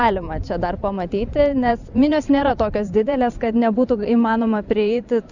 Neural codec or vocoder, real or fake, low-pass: vocoder, 44.1 kHz, 128 mel bands every 512 samples, BigVGAN v2; fake; 7.2 kHz